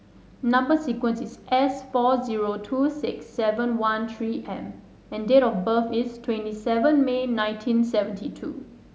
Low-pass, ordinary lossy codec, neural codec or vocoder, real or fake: none; none; none; real